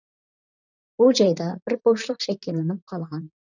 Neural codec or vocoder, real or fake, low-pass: vocoder, 44.1 kHz, 128 mel bands, Pupu-Vocoder; fake; 7.2 kHz